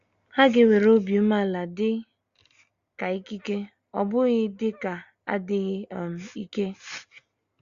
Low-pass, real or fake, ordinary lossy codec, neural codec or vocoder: 7.2 kHz; real; Opus, 64 kbps; none